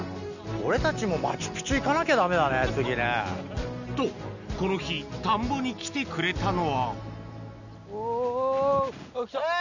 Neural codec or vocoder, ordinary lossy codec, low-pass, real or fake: none; none; 7.2 kHz; real